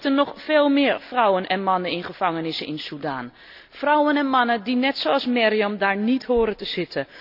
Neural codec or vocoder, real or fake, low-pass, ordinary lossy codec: none; real; 5.4 kHz; none